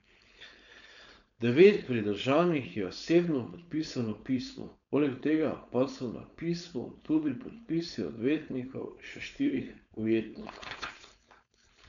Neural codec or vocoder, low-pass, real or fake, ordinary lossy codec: codec, 16 kHz, 4.8 kbps, FACodec; 7.2 kHz; fake; none